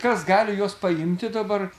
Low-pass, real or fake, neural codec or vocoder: 14.4 kHz; real; none